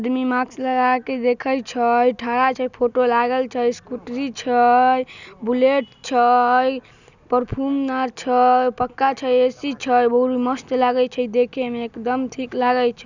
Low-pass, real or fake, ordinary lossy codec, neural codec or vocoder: 7.2 kHz; real; none; none